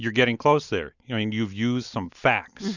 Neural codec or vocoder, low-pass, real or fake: none; 7.2 kHz; real